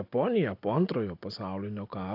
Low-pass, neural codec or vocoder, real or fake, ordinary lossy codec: 5.4 kHz; none; real; AAC, 32 kbps